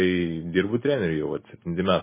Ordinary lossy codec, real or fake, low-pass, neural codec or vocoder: MP3, 16 kbps; real; 3.6 kHz; none